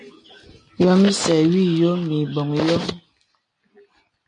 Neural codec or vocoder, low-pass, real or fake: none; 9.9 kHz; real